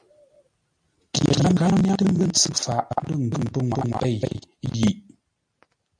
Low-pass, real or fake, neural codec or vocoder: 9.9 kHz; real; none